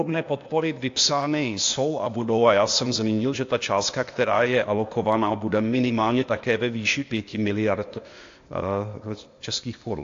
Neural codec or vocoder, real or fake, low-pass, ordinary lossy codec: codec, 16 kHz, 0.8 kbps, ZipCodec; fake; 7.2 kHz; AAC, 48 kbps